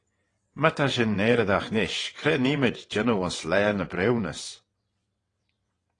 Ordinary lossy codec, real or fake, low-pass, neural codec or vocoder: AAC, 32 kbps; fake; 9.9 kHz; vocoder, 22.05 kHz, 80 mel bands, WaveNeXt